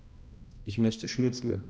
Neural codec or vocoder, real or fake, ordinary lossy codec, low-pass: codec, 16 kHz, 1 kbps, X-Codec, HuBERT features, trained on balanced general audio; fake; none; none